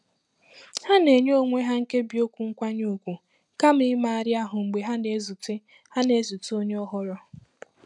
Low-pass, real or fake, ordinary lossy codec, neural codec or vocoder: 10.8 kHz; real; none; none